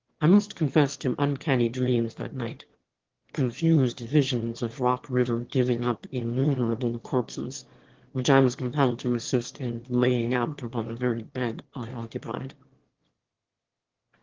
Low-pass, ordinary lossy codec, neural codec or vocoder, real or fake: 7.2 kHz; Opus, 16 kbps; autoencoder, 22.05 kHz, a latent of 192 numbers a frame, VITS, trained on one speaker; fake